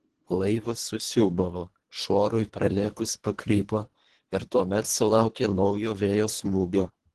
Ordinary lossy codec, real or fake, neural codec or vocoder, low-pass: Opus, 16 kbps; fake; codec, 24 kHz, 1.5 kbps, HILCodec; 10.8 kHz